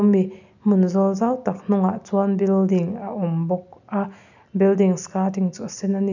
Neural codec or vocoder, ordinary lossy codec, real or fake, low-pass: none; none; real; 7.2 kHz